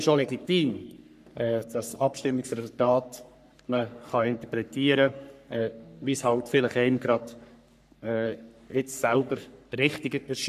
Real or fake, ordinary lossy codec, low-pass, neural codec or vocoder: fake; none; 14.4 kHz; codec, 44.1 kHz, 3.4 kbps, Pupu-Codec